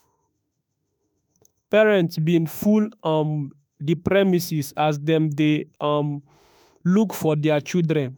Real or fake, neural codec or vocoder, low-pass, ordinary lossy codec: fake; autoencoder, 48 kHz, 32 numbers a frame, DAC-VAE, trained on Japanese speech; none; none